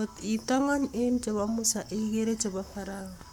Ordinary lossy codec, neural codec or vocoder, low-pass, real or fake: none; codec, 44.1 kHz, 7.8 kbps, Pupu-Codec; 19.8 kHz; fake